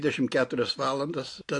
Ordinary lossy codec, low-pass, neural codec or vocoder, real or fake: AAC, 48 kbps; 10.8 kHz; none; real